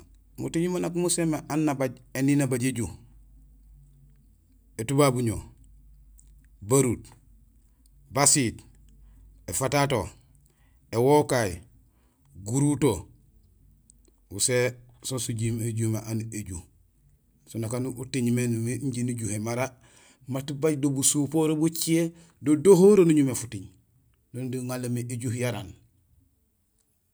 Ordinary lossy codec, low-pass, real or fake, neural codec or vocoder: none; none; real; none